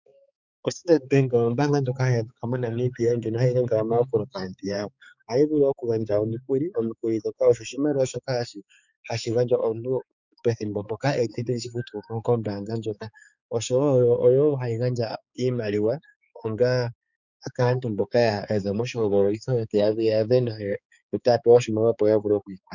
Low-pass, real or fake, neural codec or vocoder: 7.2 kHz; fake; codec, 16 kHz, 4 kbps, X-Codec, HuBERT features, trained on balanced general audio